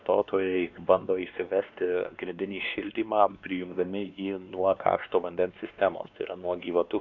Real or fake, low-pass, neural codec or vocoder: fake; 7.2 kHz; codec, 16 kHz, 2 kbps, X-Codec, WavLM features, trained on Multilingual LibriSpeech